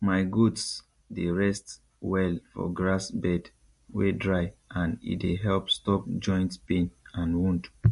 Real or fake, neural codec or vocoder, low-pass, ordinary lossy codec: real; none; 14.4 kHz; MP3, 48 kbps